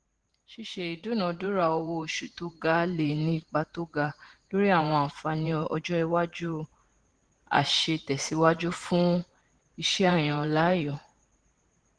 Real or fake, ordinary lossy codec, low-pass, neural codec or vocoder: fake; Opus, 16 kbps; 9.9 kHz; vocoder, 44.1 kHz, 128 mel bands every 512 samples, BigVGAN v2